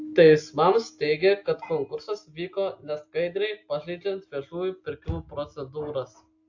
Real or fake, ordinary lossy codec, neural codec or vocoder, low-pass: real; AAC, 48 kbps; none; 7.2 kHz